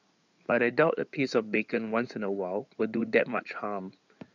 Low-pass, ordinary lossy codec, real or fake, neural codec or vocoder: 7.2 kHz; none; fake; codec, 16 kHz in and 24 kHz out, 2.2 kbps, FireRedTTS-2 codec